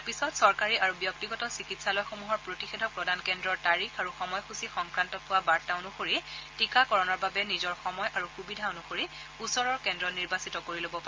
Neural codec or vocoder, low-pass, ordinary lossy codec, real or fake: none; 7.2 kHz; Opus, 24 kbps; real